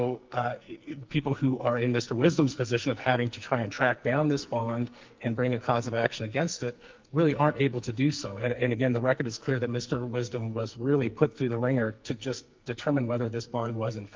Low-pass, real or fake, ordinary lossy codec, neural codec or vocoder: 7.2 kHz; fake; Opus, 24 kbps; codec, 44.1 kHz, 2.6 kbps, SNAC